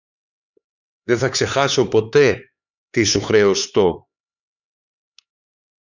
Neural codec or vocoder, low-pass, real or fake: codec, 16 kHz, 4 kbps, X-Codec, HuBERT features, trained on LibriSpeech; 7.2 kHz; fake